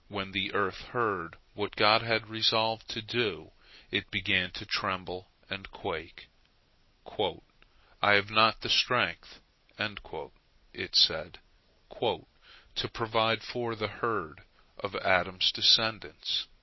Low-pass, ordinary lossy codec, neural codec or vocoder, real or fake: 7.2 kHz; MP3, 24 kbps; none; real